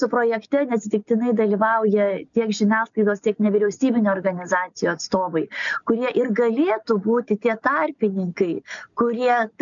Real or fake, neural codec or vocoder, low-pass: real; none; 7.2 kHz